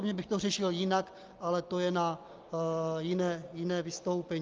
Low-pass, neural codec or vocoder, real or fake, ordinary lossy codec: 7.2 kHz; none; real; Opus, 32 kbps